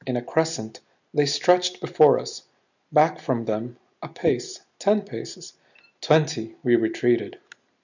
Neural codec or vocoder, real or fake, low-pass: none; real; 7.2 kHz